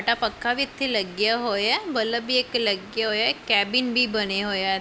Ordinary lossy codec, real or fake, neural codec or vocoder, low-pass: none; real; none; none